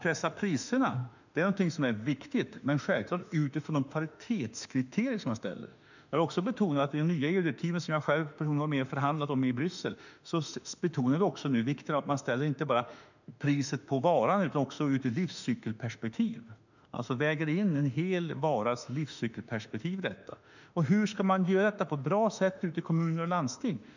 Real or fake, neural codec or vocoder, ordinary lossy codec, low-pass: fake; autoencoder, 48 kHz, 32 numbers a frame, DAC-VAE, trained on Japanese speech; none; 7.2 kHz